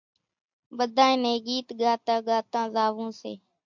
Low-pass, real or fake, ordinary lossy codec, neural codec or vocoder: 7.2 kHz; real; MP3, 64 kbps; none